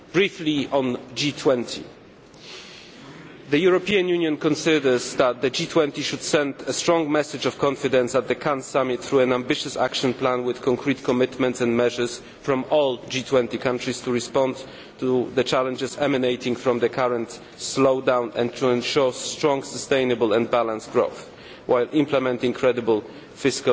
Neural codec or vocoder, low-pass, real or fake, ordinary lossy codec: none; none; real; none